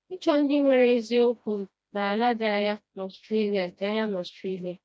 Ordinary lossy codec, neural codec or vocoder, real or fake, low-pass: none; codec, 16 kHz, 1 kbps, FreqCodec, smaller model; fake; none